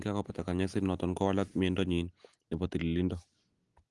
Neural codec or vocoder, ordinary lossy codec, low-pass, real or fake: none; Opus, 24 kbps; 10.8 kHz; real